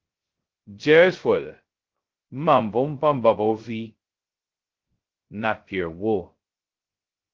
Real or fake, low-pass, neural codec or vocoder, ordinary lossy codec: fake; 7.2 kHz; codec, 16 kHz, 0.2 kbps, FocalCodec; Opus, 16 kbps